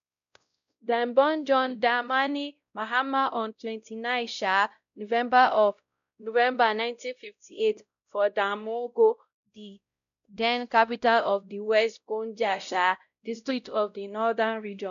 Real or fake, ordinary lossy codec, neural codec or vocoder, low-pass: fake; none; codec, 16 kHz, 0.5 kbps, X-Codec, WavLM features, trained on Multilingual LibriSpeech; 7.2 kHz